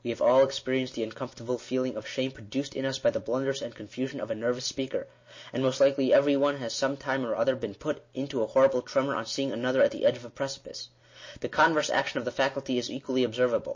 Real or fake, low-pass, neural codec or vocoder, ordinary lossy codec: real; 7.2 kHz; none; MP3, 32 kbps